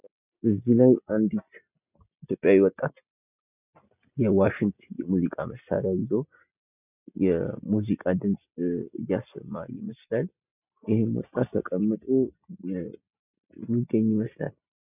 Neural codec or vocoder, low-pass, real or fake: none; 3.6 kHz; real